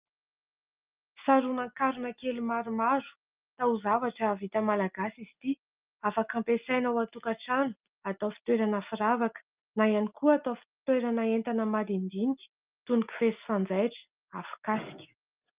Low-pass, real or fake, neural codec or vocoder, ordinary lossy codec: 3.6 kHz; real; none; Opus, 24 kbps